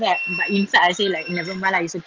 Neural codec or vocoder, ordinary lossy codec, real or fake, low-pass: none; Opus, 32 kbps; real; 7.2 kHz